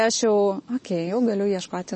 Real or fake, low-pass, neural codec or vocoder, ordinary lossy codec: real; 10.8 kHz; none; MP3, 32 kbps